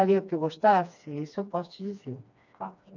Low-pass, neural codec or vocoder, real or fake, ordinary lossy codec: 7.2 kHz; codec, 16 kHz, 2 kbps, FreqCodec, smaller model; fake; none